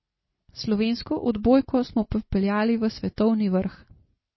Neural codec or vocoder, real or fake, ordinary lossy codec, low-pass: none; real; MP3, 24 kbps; 7.2 kHz